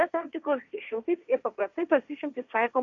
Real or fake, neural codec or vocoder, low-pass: fake; codec, 16 kHz, 1.1 kbps, Voila-Tokenizer; 7.2 kHz